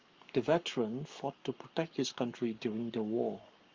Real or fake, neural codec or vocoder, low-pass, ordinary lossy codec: fake; codec, 44.1 kHz, 7.8 kbps, Pupu-Codec; 7.2 kHz; Opus, 32 kbps